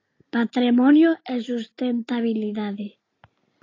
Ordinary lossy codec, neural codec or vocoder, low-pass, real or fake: AAC, 32 kbps; none; 7.2 kHz; real